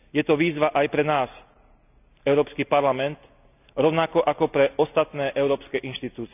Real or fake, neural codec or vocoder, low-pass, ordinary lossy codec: real; none; 3.6 kHz; none